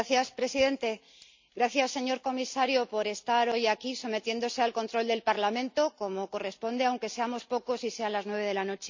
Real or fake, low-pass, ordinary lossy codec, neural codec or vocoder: real; 7.2 kHz; MP3, 64 kbps; none